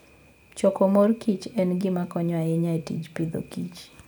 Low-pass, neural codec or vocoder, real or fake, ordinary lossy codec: none; none; real; none